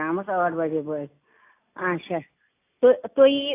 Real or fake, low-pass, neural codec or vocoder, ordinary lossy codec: real; 3.6 kHz; none; AAC, 24 kbps